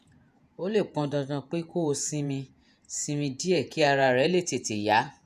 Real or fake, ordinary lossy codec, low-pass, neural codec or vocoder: fake; none; 14.4 kHz; vocoder, 48 kHz, 128 mel bands, Vocos